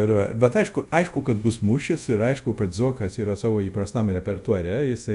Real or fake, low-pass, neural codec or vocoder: fake; 10.8 kHz; codec, 24 kHz, 0.5 kbps, DualCodec